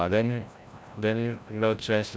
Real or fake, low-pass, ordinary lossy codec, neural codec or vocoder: fake; none; none; codec, 16 kHz, 0.5 kbps, FreqCodec, larger model